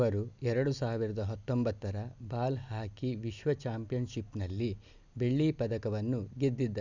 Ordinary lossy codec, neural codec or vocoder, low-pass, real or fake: none; none; 7.2 kHz; real